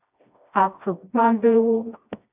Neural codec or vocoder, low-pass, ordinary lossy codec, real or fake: codec, 16 kHz, 1 kbps, FreqCodec, smaller model; 3.6 kHz; AAC, 24 kbps; fake